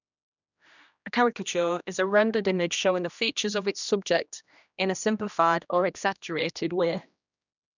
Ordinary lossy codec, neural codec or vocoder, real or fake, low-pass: none; codec, 16 kHz, 1 kbps, X-Codec, HuBERT features, trained on general audio; fake; 7.2 kHz